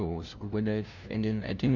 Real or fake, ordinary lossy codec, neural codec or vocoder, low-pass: fake; none; codec, 16 kHz, 0.5 kbps, FunCodec, trained on LibriTTS, 25 frames a second; none